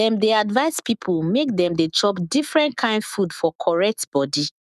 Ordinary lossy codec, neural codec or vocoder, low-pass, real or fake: none; autoencoder, 48 kHz, 128 numbers a frame, DAC-VAE, trained on Japanese speech; 14.4 kHz; fake